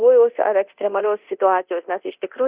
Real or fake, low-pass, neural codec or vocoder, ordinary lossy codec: fake; 3.6 kHz; codec, 24 kHz, 0.9 kbps, DualCodec; Opus, 64 kbps